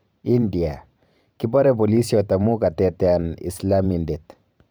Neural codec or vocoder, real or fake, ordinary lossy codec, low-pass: vocoder, 44.1 kHz, 128 mel bands every 512 samples, BigVGAN v2; fake; none; none